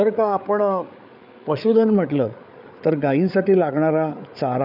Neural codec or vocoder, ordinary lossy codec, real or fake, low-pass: codec, 16 kHz, 8 kbps, FreqCodec, larger model; none; fake; 5.4 kHz